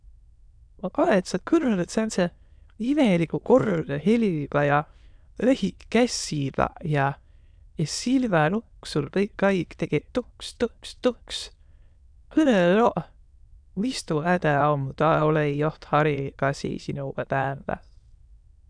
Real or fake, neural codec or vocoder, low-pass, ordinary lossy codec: fake; autoencoder, 22.05 kHz, a latent of 192 numbers a frame, VITS, trained on many speakers; 9.9 kHz; none